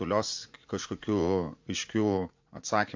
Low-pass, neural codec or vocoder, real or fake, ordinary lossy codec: 7.2 kHz; vocoder, 44.1 kHz, 80 mel bands, Vocos; fake; MP3, 48 kbps